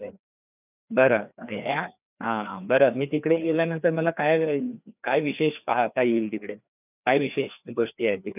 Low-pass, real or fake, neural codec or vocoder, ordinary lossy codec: 3.6 kHz; fake; codec, 16 kHz, 2 kbps, FreqCodec, larger model; none